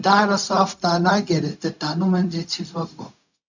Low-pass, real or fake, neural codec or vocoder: 7.2 kHz; fake; codec, 16 kHz, 0.4 kbps, LongCat-Audio-Codec